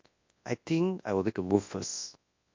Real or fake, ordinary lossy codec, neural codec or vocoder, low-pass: fake; MP3, 48 kbps; codec, 24 kHz, 0.9 kbps, WavTokenizer, large speech release; 7.2 kHz